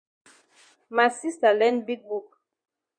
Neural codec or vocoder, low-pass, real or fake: vocoder, 24 kHz, 100 mel bands, Vocos; 9.9 kHz; fake